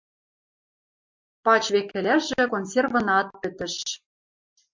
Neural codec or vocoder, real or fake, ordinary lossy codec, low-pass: none; real; MP3, 64 kbps; 7.2 kHz